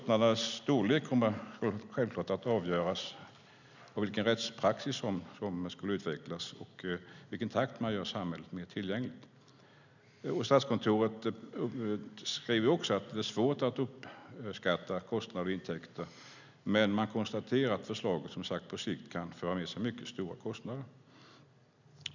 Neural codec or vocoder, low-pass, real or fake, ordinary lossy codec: none; 7.2 kHz; real; none